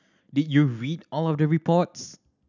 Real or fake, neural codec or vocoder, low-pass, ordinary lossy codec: real; none; 7.2 kHz; none